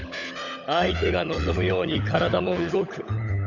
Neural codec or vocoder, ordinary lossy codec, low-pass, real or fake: codec, 16 kHz, 16 kbps, FunCodec, trained on LibriTTS, 50 frames a second; none; 7.2 kHz; fake